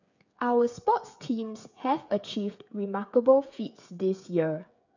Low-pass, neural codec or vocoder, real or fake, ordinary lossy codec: 7.2 kHz; codec, 16 kHz, 8 kbps, FreqCodec, smaller model; fake; none